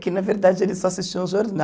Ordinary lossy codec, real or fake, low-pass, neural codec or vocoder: none; real; none; none